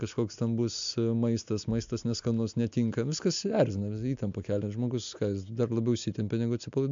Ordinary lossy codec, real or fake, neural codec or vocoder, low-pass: MP3, 64 kbps; real; none; 7.2 kHz